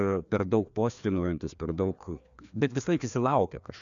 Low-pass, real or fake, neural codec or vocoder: 7.2 kHz; fake; codec, 16 kHz, 2 kbps, FreqCodec, larger model